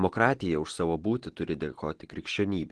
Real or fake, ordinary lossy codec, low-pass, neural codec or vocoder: real; Opus, 16 kbps; 10.8 kHz; none